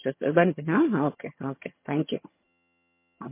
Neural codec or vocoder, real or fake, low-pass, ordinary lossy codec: vocoder, 22.05 kHz, 80 mel bands, HiFi-GAN; fake; 3.6 kHz; MP3, 24 kbps